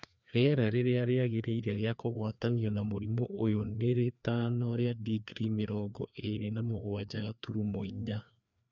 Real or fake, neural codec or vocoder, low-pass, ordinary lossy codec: fake; codec, 16 kHz, 2 kbps, FreqCodec, larger model; 7.2 kHz; none